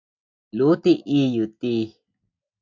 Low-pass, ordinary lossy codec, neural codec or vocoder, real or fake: 7.2 kHz; MP3, 64 kbps; none; real